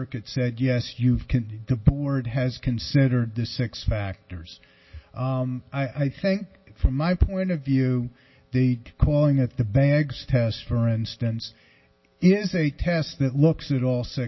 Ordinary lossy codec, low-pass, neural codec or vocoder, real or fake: MP3, 24 kbps; 7.2 kHz; none; real